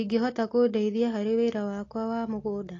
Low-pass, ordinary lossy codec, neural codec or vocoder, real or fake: 7.2 kHz; AAC, 32 kbps; none; real